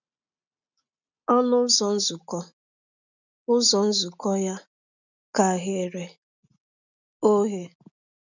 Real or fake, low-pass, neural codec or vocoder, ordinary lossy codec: real; 7.2 kHz; none; none